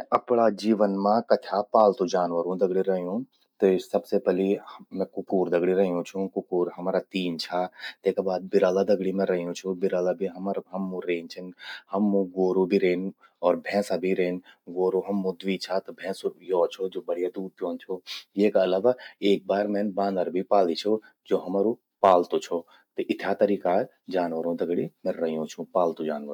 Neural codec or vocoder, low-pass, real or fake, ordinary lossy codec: none; 19.8 kHz; real; none